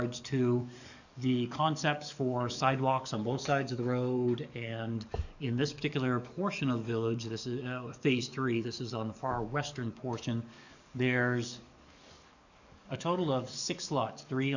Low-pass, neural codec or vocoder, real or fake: 7.2 kHz; codec, 44.1 kHz, 7.8 kbps, DAC; fake